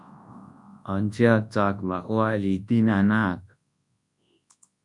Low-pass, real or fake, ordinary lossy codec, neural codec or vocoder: 10.8 kHz; fake; MP3, 64 kbps; codec, 24 kHz, 0.9 kbps, WavTokenizer, large speech release